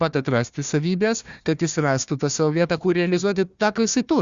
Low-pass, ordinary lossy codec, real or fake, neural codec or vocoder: 7.2 kHz; Opus, 64 kbps; fake; codec, 16 kHz, 1 kbps, FunCodec, trained on Chinese and English, 50 frames a second